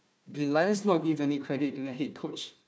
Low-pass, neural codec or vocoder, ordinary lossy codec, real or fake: none; codec, 16 kHz, 1 kbps, FunCodec, trained on Chinese and English, 50 frames a second; none; fake